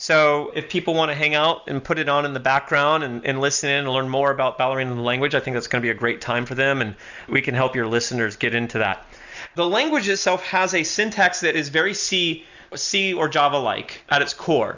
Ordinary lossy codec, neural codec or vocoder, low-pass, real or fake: Opus, 64 kbps; none; 7.2 kHz; real